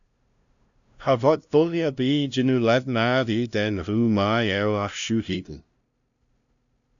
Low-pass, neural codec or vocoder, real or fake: 7.2 kHz; codec, 16 kHz, 0.5 kbps, FunCodec, trained on LibriTTS, 25 frames a second; fake